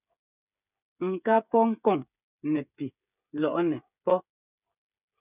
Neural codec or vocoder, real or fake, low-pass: codec, 16 kHz, 8 kbps, FreqCodec, smaller model; fake; 3.6 kHz